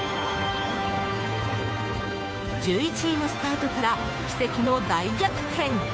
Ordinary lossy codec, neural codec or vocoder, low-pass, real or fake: none; codec, 16 kHz, 2 kbps, FunCodec, trained on Chinese and English, 25 frames a second; none; fake